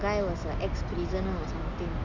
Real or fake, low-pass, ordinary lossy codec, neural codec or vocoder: real; 7.2 kHz; MP3, 64 kbps; none